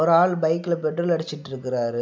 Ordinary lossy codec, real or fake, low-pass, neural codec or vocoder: none; real; none; none